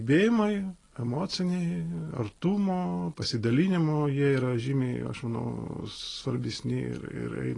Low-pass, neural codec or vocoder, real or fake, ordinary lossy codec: 10.8 kHz; none; real; AAC, 32 kbps